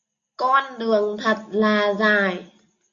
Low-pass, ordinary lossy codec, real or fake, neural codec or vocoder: 7.2 kHz; MP3, 48 kbps; real; none